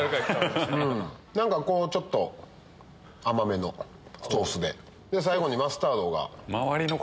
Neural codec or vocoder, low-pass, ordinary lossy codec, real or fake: none; none; none; real